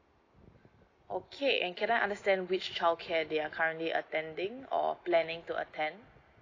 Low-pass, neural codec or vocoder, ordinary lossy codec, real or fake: 7.2 kHz; none; AAC, 32 kbps; real